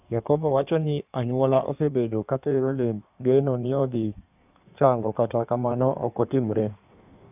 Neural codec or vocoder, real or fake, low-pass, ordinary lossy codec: codec, 16 kHz in and 24 kHz out, 1.1 kbps, FireRedTTS-2 codec; fake; 3.6 kHz; none